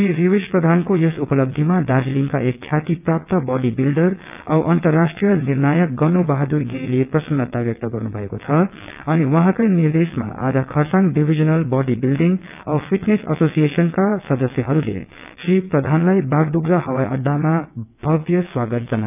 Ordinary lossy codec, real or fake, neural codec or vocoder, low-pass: none; fake; vocoder, 22.05 kHz, 80 mel bands, WaveNeXt; 3.6 kHz